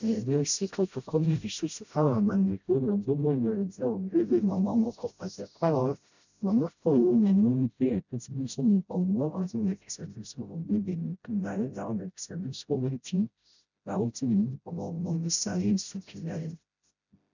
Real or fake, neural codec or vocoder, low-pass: fake; codec, 16 kHz, 0.5 kbps, FreqCodec, smaller model; 7.2 kHz